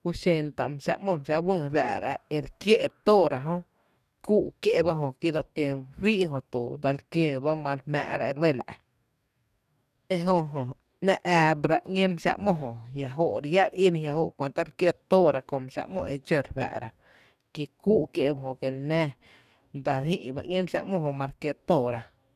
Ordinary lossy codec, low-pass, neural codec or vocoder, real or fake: none; 14.4 kHz; codec, 44.1 kHz, 2.6 kbps, DAC; fake